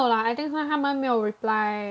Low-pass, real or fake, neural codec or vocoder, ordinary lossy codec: none; real; none; none